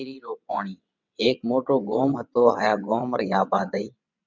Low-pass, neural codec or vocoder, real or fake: 7.2 kHz; vocoder, 22.05 kHz, 80 mel bands, WaveNeXt; fake